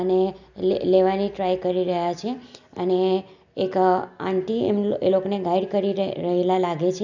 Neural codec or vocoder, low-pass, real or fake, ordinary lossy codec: none; 7.2 kHz; real; none